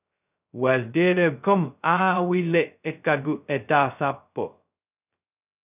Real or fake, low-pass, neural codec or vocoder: fake; 3.6 kHz; codec, 16 kHz, 0.2 kbps, FocalCodec